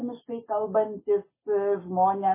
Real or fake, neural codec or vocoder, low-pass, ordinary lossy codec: real; none; 3.6 kHz; MP3, 16 kbps